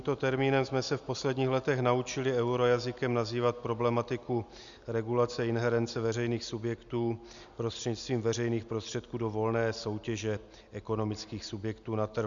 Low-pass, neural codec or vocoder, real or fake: 7.2 kHz; none; real